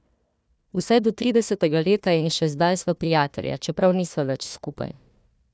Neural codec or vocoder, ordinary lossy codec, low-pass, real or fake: codec, 16 kHz, 1 kbps, FunCodec, trained on Chinese and English, 50 frames a second; none; none; fake